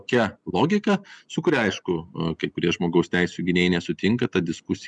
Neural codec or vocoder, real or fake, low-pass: none; real; 10.8 kHz